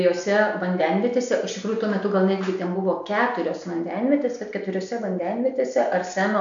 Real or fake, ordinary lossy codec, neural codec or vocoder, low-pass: real; MP3, 64 kbps; none; 7.2 kHz